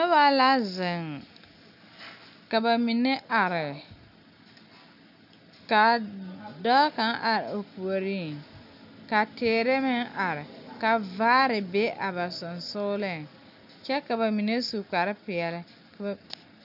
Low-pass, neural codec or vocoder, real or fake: 5.4 kHz; none; real